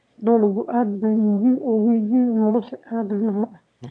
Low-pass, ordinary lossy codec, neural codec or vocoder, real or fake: 9.9 kHz; MP3, 64 kbps; autoencoder, 22.05 kHz, a latent of 192 numbers a frame, VITS, trained on one speaker; fake